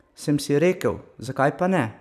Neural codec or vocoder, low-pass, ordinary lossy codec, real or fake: vocoder, 44.1 kHz, 128 mel bands every 256 samples, BigVGAN v2; 14.4 kHz; none; fake